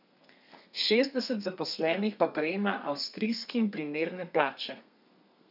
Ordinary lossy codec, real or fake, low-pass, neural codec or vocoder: none; fake; 5.4 kHz; codec, 32 kHz, 1.9 kbps, SNAC